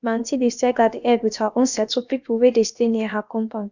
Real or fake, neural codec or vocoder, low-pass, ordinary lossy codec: fake; codec, 16 kHz, about 1 kbps, DyCAST, with the encoder's durations; 7.2 kHz; none